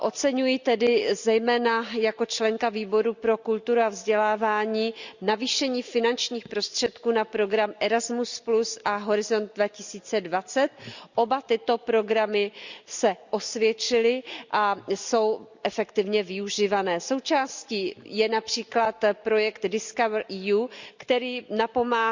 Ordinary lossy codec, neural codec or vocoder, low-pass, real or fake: Opus, 64 kbps; none; 7.2 kHz; real